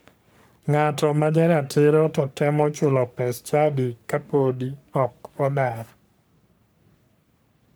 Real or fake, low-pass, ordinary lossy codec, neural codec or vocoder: fake; none; none; codec, 44.1 kHz, 3.4 kbps, Pupu-Codec